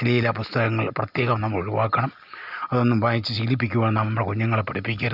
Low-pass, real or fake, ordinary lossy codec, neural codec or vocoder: 5.4 kHz; real; none; none